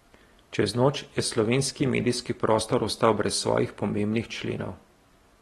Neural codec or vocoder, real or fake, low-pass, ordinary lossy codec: none; real; 19.8 kHz; AAC, 32 kbps